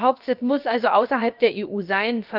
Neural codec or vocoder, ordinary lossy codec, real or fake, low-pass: codec, 16 kHz, about 1 kbps, DyCAST, with the encoder's durations; Opus, 24 kbps; fake; 5.4 kHz